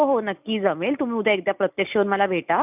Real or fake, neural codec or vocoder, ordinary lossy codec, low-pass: real; none; none; 3.6 kHz